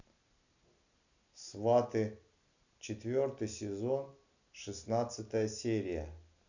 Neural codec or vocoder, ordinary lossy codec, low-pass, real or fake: none; none; 7.2 kHz; real